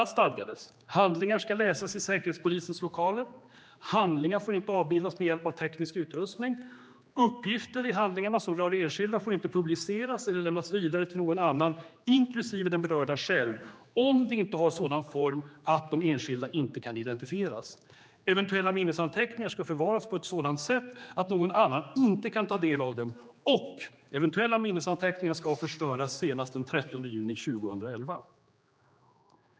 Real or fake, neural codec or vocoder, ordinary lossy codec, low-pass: fake; codec, 16 kHz, 2 kbps, X-Codec, HuBERT features, trained on general audio; none; none